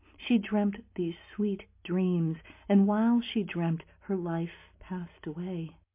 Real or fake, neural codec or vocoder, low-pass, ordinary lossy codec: real; none; 3.6 kHz; MP3, 24 kbps